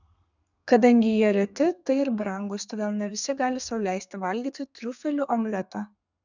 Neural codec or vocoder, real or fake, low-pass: codec, 32 kHz, 1.9 kbps, SNAC; fake; 7.2 kHz